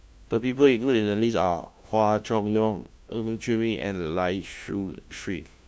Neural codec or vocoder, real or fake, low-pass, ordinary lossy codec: codec, 16 kHz, 1 kbps, FunCodec, trained on LibriTTS, 50 frames a second; fake; none; none